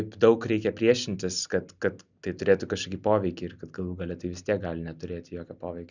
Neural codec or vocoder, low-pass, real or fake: none; 7.2 kHz; real